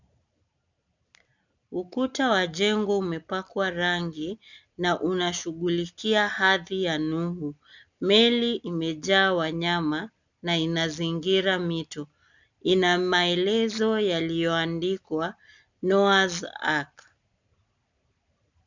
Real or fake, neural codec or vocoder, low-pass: real; none; 7.2 kHz